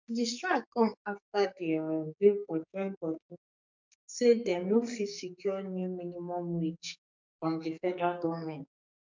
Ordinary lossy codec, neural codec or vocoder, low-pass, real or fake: MP3, 64 kbps; codec, 44.1 kHz, 2.6 kbps, SNAC; 7.2 kHz; fake